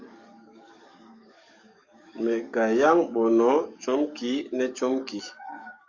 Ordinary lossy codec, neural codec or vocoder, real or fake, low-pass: Opus, 64 kbps; codec, 44.1 kHz, 7.8 kbps, DAC; fake; 7.2 kHz